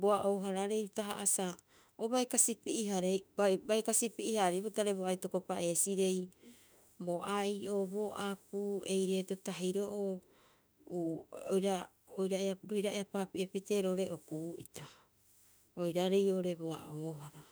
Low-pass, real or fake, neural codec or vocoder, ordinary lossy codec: none; fake; autoencoder, 48 kHz, 32 numbers a frame, DAC-VAE, trained on Japanese speech; none